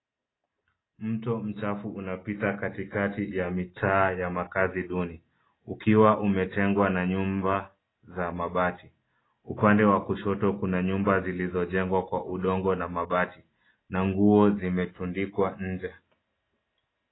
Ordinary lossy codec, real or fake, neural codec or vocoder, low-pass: AAC, 16 kbps; real; none; 7.2 kHz